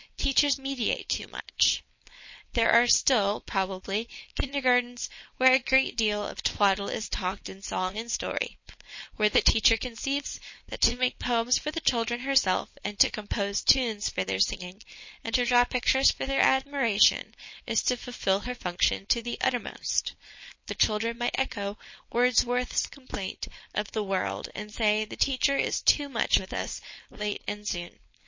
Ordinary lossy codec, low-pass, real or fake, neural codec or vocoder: MP3, 32 kbps; 7.2 kHz; fake; codec, 16 kHz, 4.8 kbps, FACodec